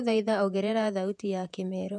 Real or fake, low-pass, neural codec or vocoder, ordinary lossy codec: fake; 10.8 kHz; vocoder, 48 kHz, 128 mel bands, Vocos; none